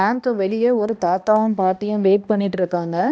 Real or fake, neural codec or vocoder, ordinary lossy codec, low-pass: fake; codec, 16 kHz, 1 kbps, X-Codec, HuBERT features, trained on balanced general audio; none; none